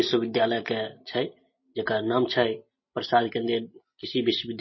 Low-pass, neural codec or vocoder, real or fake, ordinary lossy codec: 7.2 kHz; none; real; MP3, 24 kbps